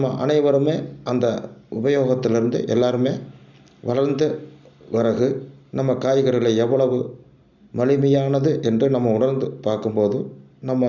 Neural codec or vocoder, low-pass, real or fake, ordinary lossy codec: none; 7.2 kHz; real; none